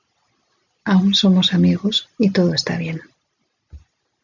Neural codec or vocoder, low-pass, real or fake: none; 7.2 kHz; real